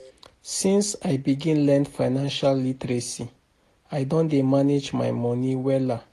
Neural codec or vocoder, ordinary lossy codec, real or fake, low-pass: none; AAC, 48 kbps; real; 14.4 kHz